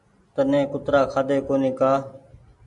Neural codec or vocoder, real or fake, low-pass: none; real; 10.8 kHz